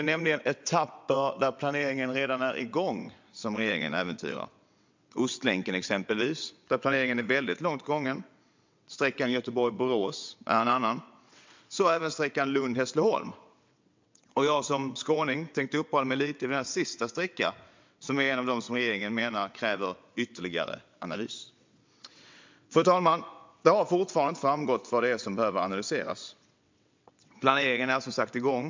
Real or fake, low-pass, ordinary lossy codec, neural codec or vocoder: fake; 7.2 kHz; MP3, 64 kbps; vocoder, 22.05 kHz, 80 mel bands, WaveNeXt